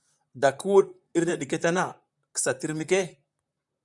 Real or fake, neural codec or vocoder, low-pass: fake; vocoder, 44.1 kHz, 128 mel bands, Pupu-Vocoder; 10.8 kHz